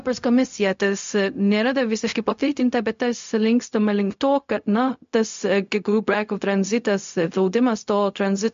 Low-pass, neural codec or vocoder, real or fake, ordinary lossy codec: 7.2 kHz; codec, 16 kHz, 0.4 kbps, LongCat-Audio-Codec; fake; MP3, 48 kbps